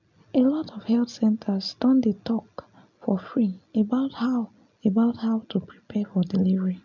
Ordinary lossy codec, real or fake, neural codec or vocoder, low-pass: none; real; none; 7.2 kHz